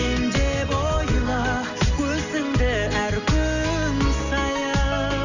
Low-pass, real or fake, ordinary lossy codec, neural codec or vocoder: 7.2 kHz; real; none; none